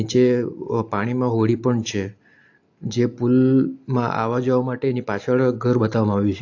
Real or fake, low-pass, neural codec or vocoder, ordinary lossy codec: real; 7.2 kHz; none; AAC, 48 kbps